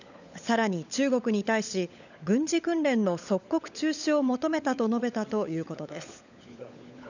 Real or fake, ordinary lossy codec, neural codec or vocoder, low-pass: fake; none; codec, 16 kHz, 16 kbps, FunCodec, trained on LibriTTS, 50 frames a second; 7.2 kHz